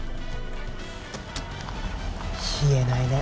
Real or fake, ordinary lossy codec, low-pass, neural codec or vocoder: real; none; none; none